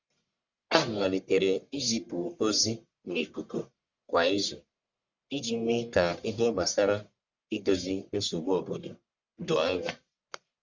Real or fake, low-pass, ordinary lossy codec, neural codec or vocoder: fake; 7.2 kHz; Opus, 64 kbps; codec, 44.1 kHz, 1.7 kbps, Pupu-Codec